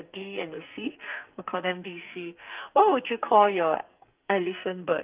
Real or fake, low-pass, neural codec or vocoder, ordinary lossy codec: fake; 3.6 kHz; codec, 44.1 kHz, 2.6 kbps, SNAC; Opus, 32 kbps